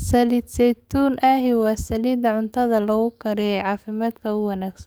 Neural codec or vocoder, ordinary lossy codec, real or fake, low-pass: codec, 44.1 kHz, 7.8 kbps, DAC; none; fake; none